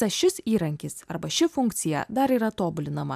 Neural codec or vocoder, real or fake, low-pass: none; real; 14.4 kHz